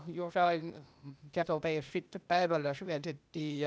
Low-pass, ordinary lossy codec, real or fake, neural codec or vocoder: none; none; fake; codec, 16 kHz, 0.8 kbps, ZipCodec